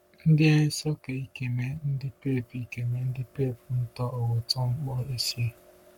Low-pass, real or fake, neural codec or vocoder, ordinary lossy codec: 19.8 kHz; fake; codec, 44.1 kHz, 7.8 kbps, Pupu-Codec; MP3, 96 kbps